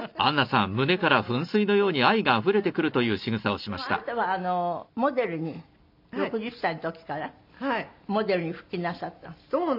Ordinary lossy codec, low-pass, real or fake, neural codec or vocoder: MP3, 48 kbps; 5.4 kHz; real; none